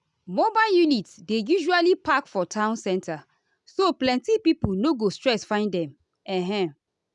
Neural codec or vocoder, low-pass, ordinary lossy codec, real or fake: none; 10.8 kHz; none; real